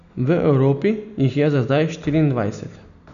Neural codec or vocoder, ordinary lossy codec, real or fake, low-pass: none; none; real; 7.2 kHz